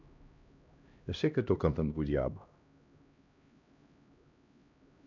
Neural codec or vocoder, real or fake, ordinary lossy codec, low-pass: codec, 16 kHz, 1 kbps, X-Codec, HuBERT features, trained on LibriSpeech; fake; none; 7.2 kHz